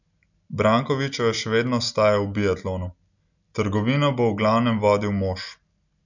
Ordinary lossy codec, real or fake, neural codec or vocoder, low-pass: none; real; none; 7.2 kHz